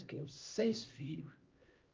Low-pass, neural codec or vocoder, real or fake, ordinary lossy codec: 7.2 kHz; codec, 16 kHz, 1 kbps, X-Codec, HuBERT features, trained on LibriSpeech; fake; Opus, 32 kbps